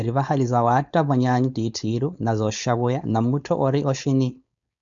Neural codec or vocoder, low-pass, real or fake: codec, 16 kHz, 4.8 kbps, FACodec; 7.2 kHz; fake